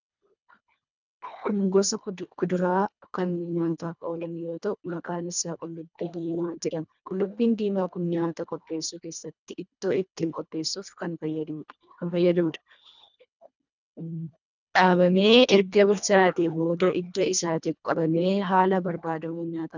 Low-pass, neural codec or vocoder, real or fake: 7.2 kHz; codec, 24 kHz, 1.5 kbps, HILCodec; fake